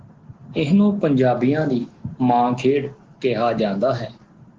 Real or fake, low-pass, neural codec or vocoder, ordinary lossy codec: real; 7.2 kHz; none; Opus, 16 kbps